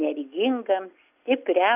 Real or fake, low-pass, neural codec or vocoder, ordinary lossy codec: real; 3.6 kHz; none; AAC, 32 kbps